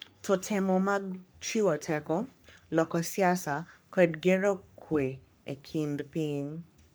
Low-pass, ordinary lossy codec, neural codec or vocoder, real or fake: none; none; codec, 44.1 kHz, 3.4 kbps, Pupu-Codec; fake